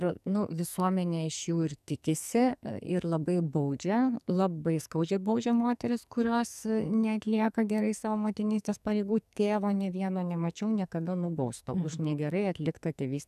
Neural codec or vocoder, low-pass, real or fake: codec, 44.1 kHz, 2.6 kbps, SNAC; 14.4 kHz; fake